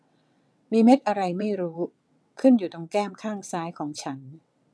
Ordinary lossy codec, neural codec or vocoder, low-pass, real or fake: none; vocoder, 22.05 kHz, 80 mel bands, WaveNeXt; none; fake